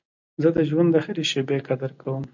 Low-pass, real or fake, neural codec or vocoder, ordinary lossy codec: 7.2 kHz; real; none; MP3, 48 kbps